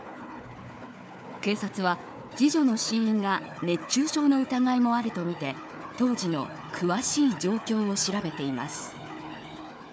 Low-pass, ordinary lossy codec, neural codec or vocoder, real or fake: none; none; codec, 16 kHz, 4 kbps, FunCodec, trained on Chinese and English, 50 frames a second; fake